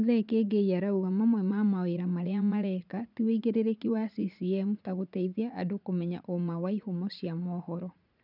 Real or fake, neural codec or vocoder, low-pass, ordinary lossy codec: fake; vocoder, 44.1 kHz, 80 mel bands, Vocos; 5.4 kHz; none